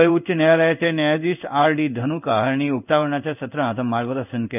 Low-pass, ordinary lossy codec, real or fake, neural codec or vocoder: 3.6 kHz; none; fake; codec, 16 kHz in and 24 kHz out, 1 kbps, XY-Tokenizer